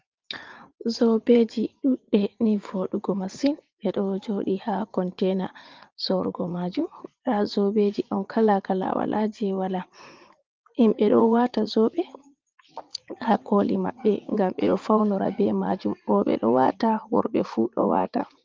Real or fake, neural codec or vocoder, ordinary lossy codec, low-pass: fake; vocoder, 44.1 kHz, 80 mel bands, Vocos; Opus, 32 kbps; 7.2 kHz